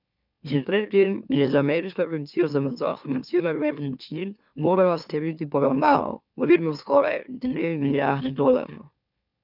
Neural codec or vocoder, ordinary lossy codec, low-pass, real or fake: autoencoder, 44.1 kHz, a latent of 192 numbers a frame, MeloTTS; none; 5.4 kHz; fake